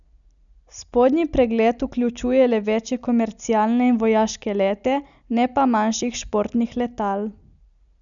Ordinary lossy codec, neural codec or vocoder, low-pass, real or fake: none; none; 7.2 kHz; real